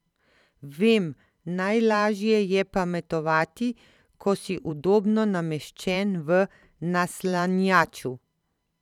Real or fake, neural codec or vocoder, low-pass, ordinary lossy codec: fake; vocoder, 44.1 kHz, 128 mel bands every 512 samples, BigVGAN v2; 19.8 kHz; none